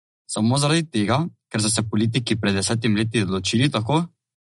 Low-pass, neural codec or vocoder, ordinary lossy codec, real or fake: 19.8 kHz; none; MP3, 48 kbps; real